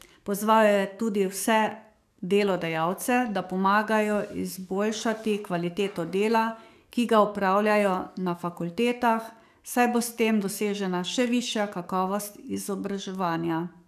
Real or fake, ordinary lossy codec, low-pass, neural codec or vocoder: fake; none; 14.4 kHz; codec, 44.1 kHz, 7.8 kbps, DAC